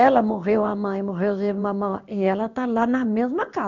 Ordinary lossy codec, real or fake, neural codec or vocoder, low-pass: none; fake; codec, 16 kHz in and 24 kHz out, 1 kbps, XY-Tokenizer; 7.2 kHz